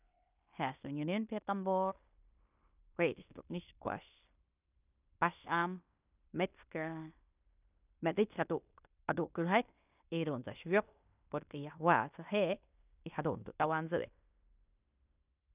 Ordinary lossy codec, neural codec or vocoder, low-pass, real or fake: none; codec, 16 kHz in and 24 kHz out, 0.9 kbps, LongCat-Audio-Codec, fine tuned four codebook decoder; 3.6 kHz; fake